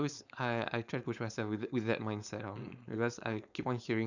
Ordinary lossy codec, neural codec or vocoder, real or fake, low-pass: none; codec, 16 kHz, 4.8 kbps, FACodec; fake; 7.2 kHz